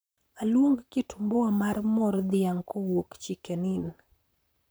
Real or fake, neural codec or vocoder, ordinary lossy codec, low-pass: fake; vocoder, 44.1 kHz, 128 mel bands, Pupu-Vocoder; none; none